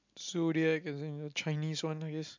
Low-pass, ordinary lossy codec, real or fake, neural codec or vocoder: 7.2 kHz; MP3, 64 kbps; real; none